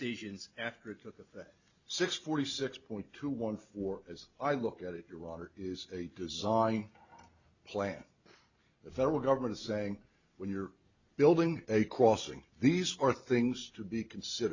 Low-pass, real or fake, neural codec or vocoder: 7.2 kHz; real; none